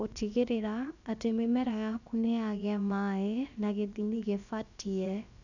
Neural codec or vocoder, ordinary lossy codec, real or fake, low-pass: codec, 16 kHz, about 1 kbps, DyCAST, with the encoder's durations; none; fake; 7.2 kHz